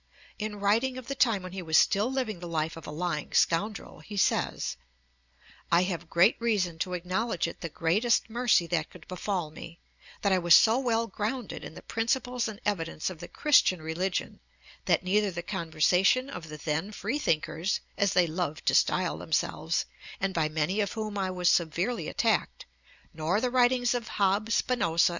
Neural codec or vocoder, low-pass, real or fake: none; 7.2 kHz; real